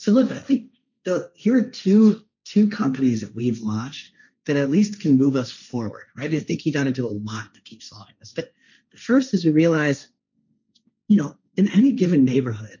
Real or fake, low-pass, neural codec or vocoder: fake; 7.2 kHz; codec, 16 kHz, 1.1 kbps, Voila-Tokenizer